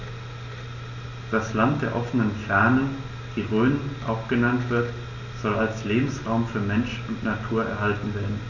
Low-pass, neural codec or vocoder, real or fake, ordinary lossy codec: 7.2 kHz; none; real; none